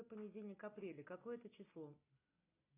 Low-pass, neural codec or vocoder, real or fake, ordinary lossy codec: 3.6 kHz; none; real; AAC, 24 kbps